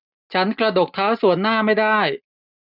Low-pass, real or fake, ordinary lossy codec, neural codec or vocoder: 5.4 kHz; real; none; none